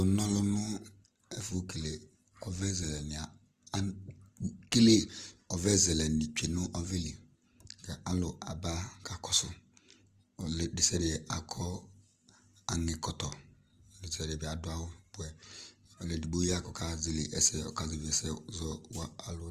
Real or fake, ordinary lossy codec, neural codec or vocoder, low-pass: real; Opus, 24 kbps; none; 14.4 kHz